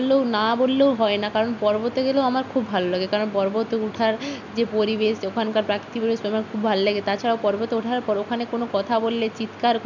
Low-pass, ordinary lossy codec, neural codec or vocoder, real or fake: 7.2 kHz; none; none; real